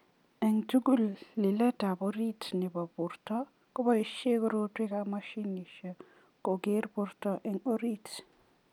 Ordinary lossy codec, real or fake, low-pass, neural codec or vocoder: none; real; 19.8 kHz; none